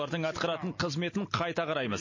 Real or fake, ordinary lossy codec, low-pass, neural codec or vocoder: real; MP3, 32 kbps; 7.2 kHz; none